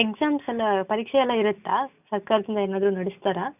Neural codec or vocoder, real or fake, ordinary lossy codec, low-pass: none; real; none; 3.6 kHz